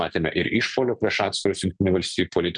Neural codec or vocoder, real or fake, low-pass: none; real; 9.9 kHz